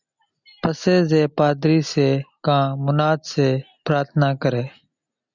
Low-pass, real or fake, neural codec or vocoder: 7.2 kHz; real; none